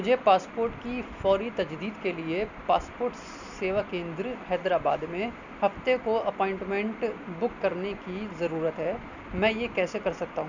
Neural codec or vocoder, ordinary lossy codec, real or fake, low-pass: none; none; real; 7.2 kHz